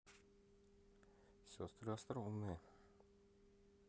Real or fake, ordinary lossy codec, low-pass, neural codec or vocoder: real; none; none; none